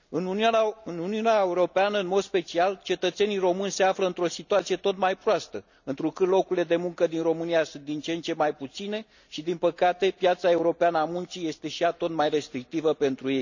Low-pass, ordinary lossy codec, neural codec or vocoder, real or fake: 7.2 kHz; none; none; real